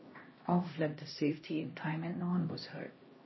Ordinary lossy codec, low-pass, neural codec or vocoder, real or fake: MP3, 24 kbps; 7.2 kHz; codec, 16 kHz, 1 kbps, X-Codec, HuBERT features, trained on LibriSpeech; fake